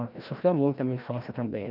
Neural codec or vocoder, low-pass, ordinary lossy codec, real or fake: codec, 16 kHz, 1 kbps, FreqCodec, larger model; 5.4 kHz; none; fake